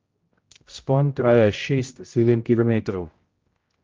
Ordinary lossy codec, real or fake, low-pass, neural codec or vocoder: Opus, 32 kbps; fake; 7.2 kHz; codec, 16 kHz, 0.5 kbps, X-Codec, HuBERT features, trained on general audio